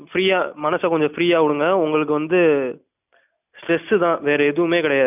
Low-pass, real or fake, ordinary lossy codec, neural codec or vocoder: 3.6 kHz; real; none; none